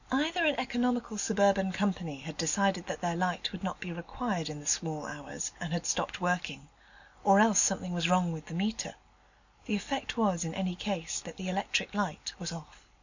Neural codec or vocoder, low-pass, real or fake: none; 7.2 kHz; real